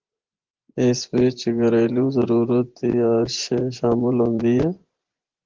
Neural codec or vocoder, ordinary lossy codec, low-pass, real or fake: vocoder, 24 kHz, 100 mel bands, Vocos; Opus, 16 kbps; 7.2 kHz; fake